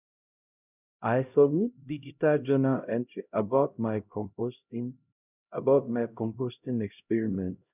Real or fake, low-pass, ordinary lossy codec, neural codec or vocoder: fake; 3.6 kHz; none; codec, 16 kHz, 0.5 kbps, X-Codec, HuBERT features, trained on LibriSpeech